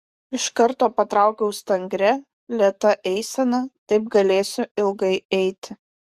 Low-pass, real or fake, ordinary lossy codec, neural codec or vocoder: 14.4 kHz; fake; Opus, 64 kbps; codec, 44.1 kHz, 7.8 kbps, DAC